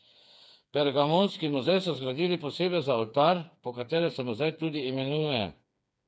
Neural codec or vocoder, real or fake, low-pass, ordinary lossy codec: codec, 16 kHz, 4 kbps, FreqCodec, smaller model; fake; none; none